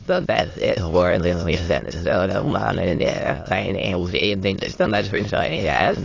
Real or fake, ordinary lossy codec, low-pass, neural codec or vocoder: fake; AAC, 32 kbps; 7.2 kHz; autoencoder, 22.05 kHz, a latent of 192 numbers a frame, VITS, trained on many speakers